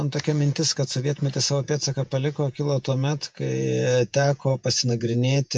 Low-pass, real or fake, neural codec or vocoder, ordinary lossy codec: 10.8 kHz; fake; vocoder, 48 kHz, 128 mel bands, Vocos; MP3, 64 kbps